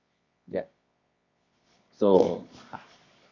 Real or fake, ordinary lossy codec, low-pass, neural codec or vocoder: fake; none; 7.2 kHz; codec, 24 kHz, 0.9 kbps, WavTokenizer, medium music audio release